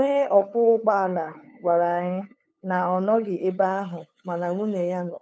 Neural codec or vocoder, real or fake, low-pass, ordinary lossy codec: codec, 16 kHz, 8 kbps, FunCodec, trained on LibriTTS, 25 frames a second; fake; none; none